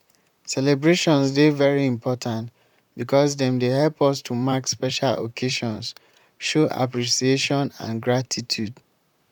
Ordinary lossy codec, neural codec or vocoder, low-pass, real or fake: none; vocoder, 44.1 kHz, 128 mel bands, Pupu-Vocoder; 19.8 kHz; fake